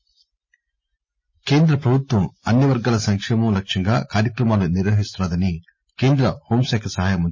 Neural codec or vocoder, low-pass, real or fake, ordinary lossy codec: none; 7.2 kHz; real; MP3, 32 kbps